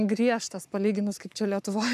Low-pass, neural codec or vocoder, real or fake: 14.4 kHz; codec, 44.1 kHz, 7.8 kbps, Pupu-Codec; fake